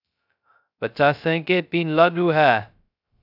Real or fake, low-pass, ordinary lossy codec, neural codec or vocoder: fake; 5.4 kHz; AAC, 48 kbps; codec, 16 kHz, 0.2 kbps, FocalCodec